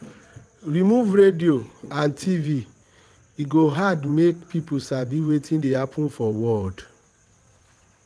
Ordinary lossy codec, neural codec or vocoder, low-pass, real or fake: none; vocoder, 22.05 kHz, 80 mel bands, WaveNeXt; none; fake